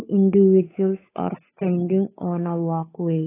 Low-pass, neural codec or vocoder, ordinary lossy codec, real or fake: 3.6 kHz; codec, 16 kHz, 8 kbps, FunCodec, trained on Chinese and English, 25 frames a second; AAC, 16 kbps; fake